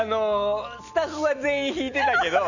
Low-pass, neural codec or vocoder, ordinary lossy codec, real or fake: 7.2 kHz; none; none; real